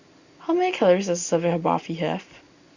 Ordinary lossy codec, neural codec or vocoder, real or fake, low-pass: Opus, 64 kbps; none; real; 7.2 kHz